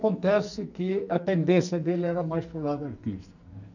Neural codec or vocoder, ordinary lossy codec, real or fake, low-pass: codec, 44.1 kHz, 2.6 kbps, SNAC; none; fake; 7.2 kHz